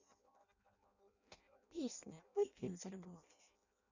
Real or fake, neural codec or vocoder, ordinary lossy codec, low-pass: fake; codec, 16 kHz in and 24 kHz out, 0.6 kbps, FireRedTTS-2 codec; none; 7.2 kHz